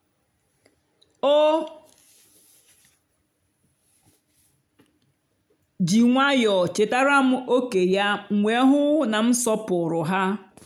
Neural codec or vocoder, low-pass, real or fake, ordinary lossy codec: none; 19.8 kHz; real; none